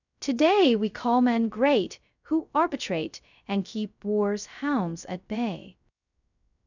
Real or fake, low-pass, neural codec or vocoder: fake; 7.2 kHz; codec, 16 kHz, 0.2 kbps, FocalCodec